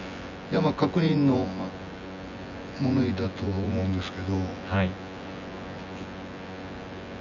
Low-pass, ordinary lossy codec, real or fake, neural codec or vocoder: 7.2 kHz; none; fake; vocoder, 24 kHz, 100 mel bands, Vocos